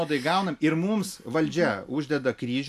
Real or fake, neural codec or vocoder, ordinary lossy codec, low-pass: real; none; MP3, 96 kbps; 14.4 kHz